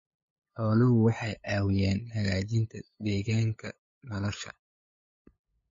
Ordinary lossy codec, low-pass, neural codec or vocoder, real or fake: MP3, 32 kbps; 7.2 kHz; codec, 16 kHz, 8 kbps, FunCodec, trained on LibriTTS, 25 frames a second; fake